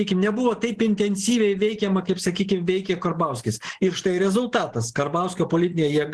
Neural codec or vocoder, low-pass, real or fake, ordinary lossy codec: none; 10.8 kHz; real; Opus, 16 kbps